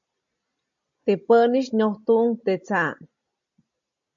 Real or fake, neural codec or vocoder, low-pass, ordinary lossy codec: real; none; 7.2 kHz; MP3, 48 kbps